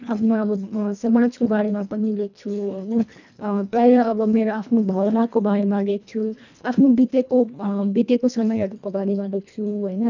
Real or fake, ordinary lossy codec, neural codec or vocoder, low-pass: fake; none; codec, 24 kHz, 1.5 kbps, HILCodec; 7.2 kHz